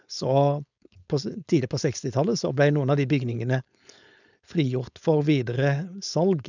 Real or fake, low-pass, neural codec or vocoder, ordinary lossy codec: fake; 7.2 kHz; codec, 16 kHz, 4.8 kbps, FACodec; none